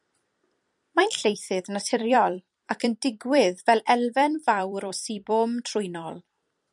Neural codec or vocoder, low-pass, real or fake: vocoder, 44.1 kHz, 128 mel bands every 256 samples, BigVGAN v2; 10.8 kHz; fake